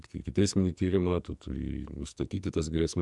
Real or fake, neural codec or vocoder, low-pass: fake; codec, 44.1 kHz, 2.6 kbps, SNAC; 10.8 kHz